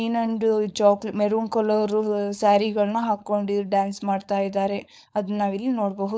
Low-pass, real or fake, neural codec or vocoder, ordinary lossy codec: none; fake; codec, 16 kHz, 4.8 kbps, FACodec; none